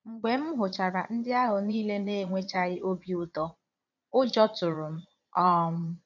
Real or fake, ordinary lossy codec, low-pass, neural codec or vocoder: fake; none; 7.2 kHz; vocoder, 22.05 kHz, 80 mel bands, Vocos